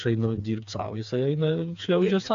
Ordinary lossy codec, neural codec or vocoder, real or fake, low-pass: AAC, 64 kbps; codec, 16 kHz, 4 kbps, FreqCodec, smaller model; fake; 7.2 kHz